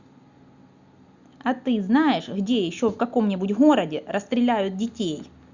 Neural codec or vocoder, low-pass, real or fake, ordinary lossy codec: none; 7.2 kHz; real; Opus, 64 kbps